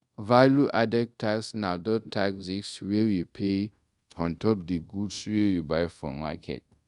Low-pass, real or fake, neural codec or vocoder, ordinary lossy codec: 10.8 kHz; fake; codec, 24 kHz, 0.5 kbps, DualCodec; Opus, 64 kbps